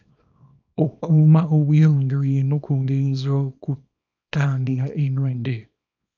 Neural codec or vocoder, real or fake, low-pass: codec, 24 kHz, 0.9 kbps, WavTokenizer, small release; fake; 7.2 kHz